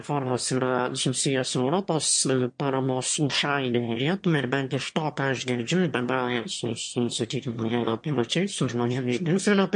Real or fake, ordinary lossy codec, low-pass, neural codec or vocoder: fake; MP3, 48 kbps; 9.9 kHz; autoencoder, 22.05 kHz, a latent of 192 numbers a frame, VITS, trained on one speaker